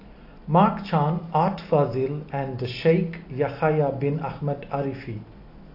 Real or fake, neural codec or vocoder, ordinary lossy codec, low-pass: real; none; AAC, 32 kbps; 5.4 kHz